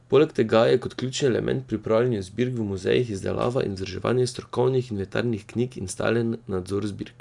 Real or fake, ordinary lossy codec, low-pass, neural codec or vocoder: real; none; 10.8 kHz; none